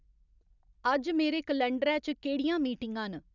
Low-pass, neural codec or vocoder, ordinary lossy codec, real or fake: 7.2 kHz; none; none; real